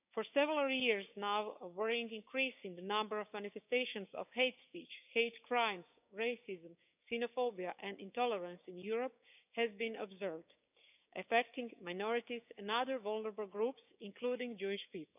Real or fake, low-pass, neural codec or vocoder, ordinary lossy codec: fake; 3.6 kHz; codec, 16 kHz, 6 kbps, DAC; none